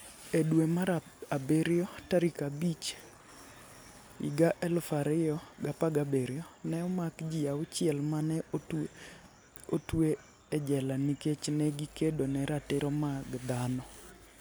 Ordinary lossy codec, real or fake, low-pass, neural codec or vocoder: none; real; none; none